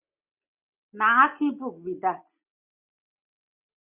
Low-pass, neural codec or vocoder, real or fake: 3.6 kHz; none; real